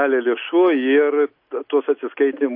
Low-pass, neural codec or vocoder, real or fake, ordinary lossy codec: 5.4 kHz; none; real; MP3, 32 kbps